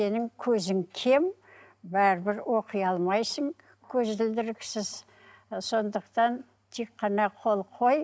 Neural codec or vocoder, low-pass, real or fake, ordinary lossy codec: none; none; real; none